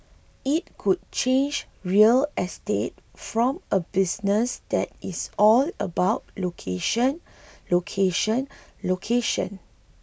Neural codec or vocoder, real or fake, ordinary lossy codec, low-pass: none; real; none; none